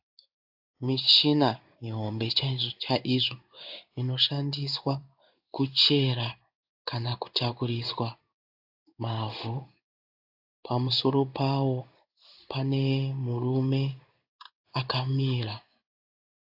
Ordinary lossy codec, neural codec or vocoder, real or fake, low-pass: AAC, 48 kbps; codec, 16 kHz in and 24 kHz out, 1 kbps, XY-Tokenizer; fake; 5.4 kHz